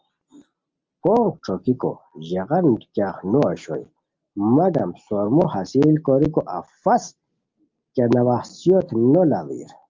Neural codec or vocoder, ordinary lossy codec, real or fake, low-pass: none; Opus, 24 kbps; real; 7.2 kHz